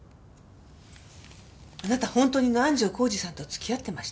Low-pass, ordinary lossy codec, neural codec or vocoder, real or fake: none; none; none; real